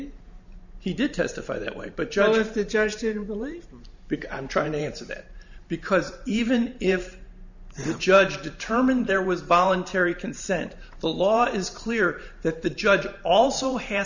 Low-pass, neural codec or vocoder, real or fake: 7.2 kHz; vocoder, 44.1 kHz, 128 mel bands every 512 samples, BigVGAN v2; fake